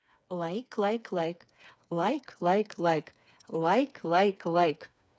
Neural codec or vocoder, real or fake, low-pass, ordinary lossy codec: codec, 16 kHz, 4 kbps, FreqCodec, smaller model; fake; none; none